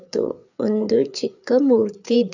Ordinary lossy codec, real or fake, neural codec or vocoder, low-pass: none; fake; codec, 16 kHz, 4 kbps, FreqCodec, larger model; 7.2 kHz